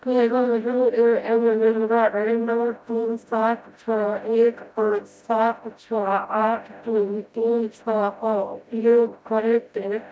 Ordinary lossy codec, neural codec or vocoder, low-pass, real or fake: none; codec, 16 kHz, 0.5 kbps, FreqCodec, smaller model; none; fake